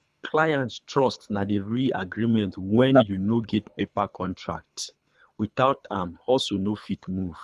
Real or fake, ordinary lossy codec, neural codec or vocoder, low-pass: fake; none; codec, 24 kHz, 3 kbps, HILCodec; none